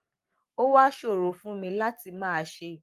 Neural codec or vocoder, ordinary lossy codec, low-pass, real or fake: codec, 44.1 kHz, 7.8 kbps, Pupu-Codec; Opus, 32 kbps; 14.4 kHz; fake